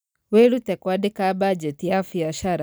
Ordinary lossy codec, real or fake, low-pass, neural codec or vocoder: none; real; none; none